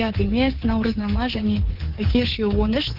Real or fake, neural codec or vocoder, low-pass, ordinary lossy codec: fake; codec, 24 kHz, 3.1 kbps, DualCodec; 5.4 kHz; Opus, 16 kbps